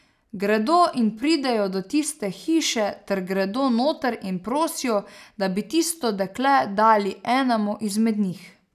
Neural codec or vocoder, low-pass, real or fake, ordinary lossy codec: none; 14.4 kHz; real; none